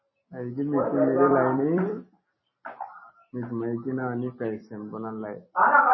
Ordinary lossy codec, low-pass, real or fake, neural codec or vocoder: MP3, 24 kbps; 7.2 kHz; fake; vocoder, 44.1 kHz, 128 mel bands every 256 samples, BigVGAN v2